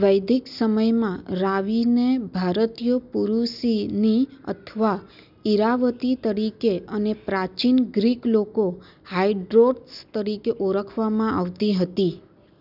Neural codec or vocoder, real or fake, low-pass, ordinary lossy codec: none; real; 5.4 kHz; none